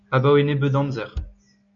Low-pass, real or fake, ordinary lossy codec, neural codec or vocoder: 7.2 kHz; real; MP3, 64 kbps; none